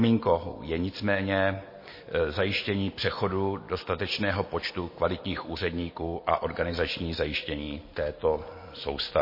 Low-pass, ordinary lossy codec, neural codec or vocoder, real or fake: 5.4 kHz; MP3, 24 kbps; none; real